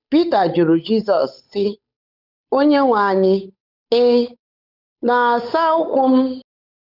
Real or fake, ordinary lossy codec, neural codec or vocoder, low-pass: fake; none; codec, 16 kHz, 8 kbps, FunCodec, trained on Chinese and English, 25 frames a second; 5.4 kHz